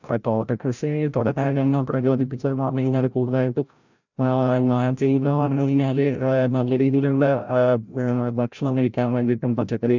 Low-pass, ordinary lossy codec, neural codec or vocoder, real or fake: 7.2 kHz; none; codec, 16 kHz, 0.5 kbps, FreqCodec, larger model; fake